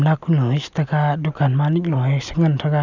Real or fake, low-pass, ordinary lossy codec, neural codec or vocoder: real; 7.2 kHz; none; none